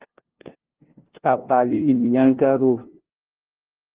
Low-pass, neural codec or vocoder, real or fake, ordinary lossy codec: 3.6 kHz; codec, 16 kHz, 0.5 kbps, FunCodec, trained on LibriTTS, 25 frames a second; fake; Opus, 16 kbps